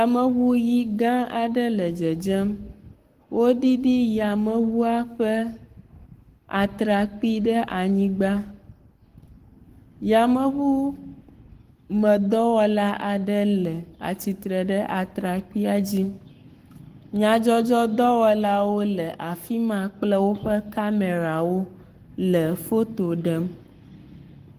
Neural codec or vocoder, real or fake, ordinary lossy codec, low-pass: codec, 44.1 kHz, 7.8 kbps, Pupu-Codec; fake; Opus, 24 kbps; 14.4 kHz